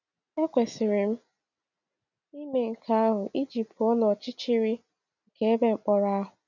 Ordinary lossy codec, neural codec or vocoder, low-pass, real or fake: none; none; 7.2 kHz; real